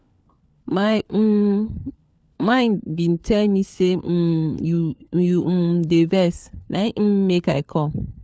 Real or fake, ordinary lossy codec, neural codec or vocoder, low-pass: fake; none; codec, 16 kHz, 4 kbps, FunCodec, trained on LibriTTS, 50 frames a second; none